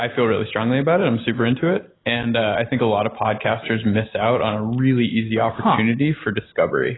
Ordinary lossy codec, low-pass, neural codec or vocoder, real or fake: AAC, 16 kbps; 7.2 kHz; none; real